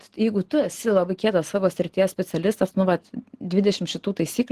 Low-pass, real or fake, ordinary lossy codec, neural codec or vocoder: 14.4 kHz; real; Opus, 16 kbps; none